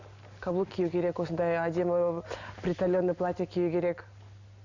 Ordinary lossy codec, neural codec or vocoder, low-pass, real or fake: none; none; 7.2 kHz; real